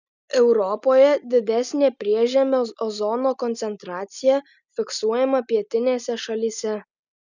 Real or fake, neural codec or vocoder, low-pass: real; none; 7.2 kHz